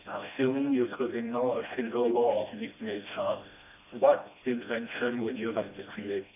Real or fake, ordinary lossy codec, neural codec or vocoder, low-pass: fake; none; codec, 16 kHz, 1 kbps, FreqCodec, smaller model; 3.6 kHz